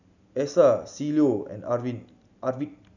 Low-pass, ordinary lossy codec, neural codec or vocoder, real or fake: 7.2 kHz; none; none; real